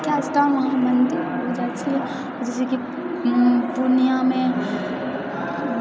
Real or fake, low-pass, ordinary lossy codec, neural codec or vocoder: real; none; none; none